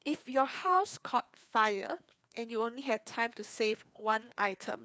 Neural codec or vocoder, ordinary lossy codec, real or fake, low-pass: codec, 16 kHz, 2 kbps, FreqCodec, larger model; none; fake; none